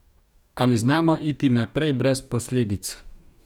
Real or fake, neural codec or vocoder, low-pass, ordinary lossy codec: fake; codec, 44.1 kHz, 2.6 kbps, DAC; 19.8 kHz; none